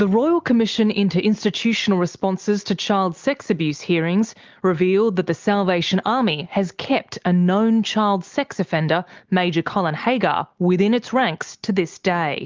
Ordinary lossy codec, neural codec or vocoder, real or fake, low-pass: Opus, 32 kbps; none; real; 7.2 kHz